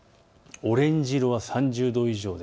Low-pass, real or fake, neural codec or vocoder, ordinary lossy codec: none; real; none; none